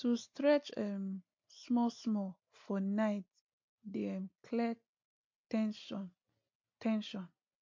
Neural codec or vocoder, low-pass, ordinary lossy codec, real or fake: none; 7.2 kHz; MP3, 48 kbps; real